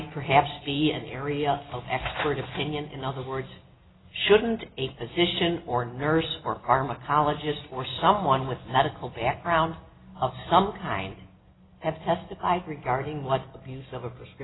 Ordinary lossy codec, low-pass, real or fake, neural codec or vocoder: AAC, 16 kbps; 7.2 kHz; real; none